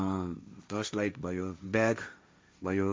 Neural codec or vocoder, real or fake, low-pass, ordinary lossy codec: codec, 16 kHz, 1.1 kbps, Voila-Tokenizer; fake; none; none